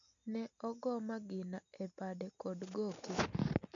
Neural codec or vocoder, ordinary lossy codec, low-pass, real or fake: none; MP3, 64 kbps; 7.2 kHz; real